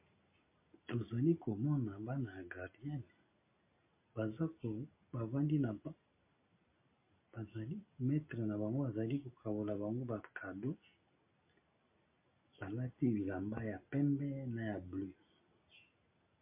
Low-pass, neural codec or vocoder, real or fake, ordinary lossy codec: 3.6 kHz; none; real; MP3, 24 kbps